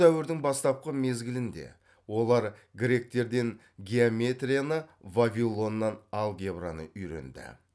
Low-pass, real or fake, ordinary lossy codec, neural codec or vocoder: none; real; none; none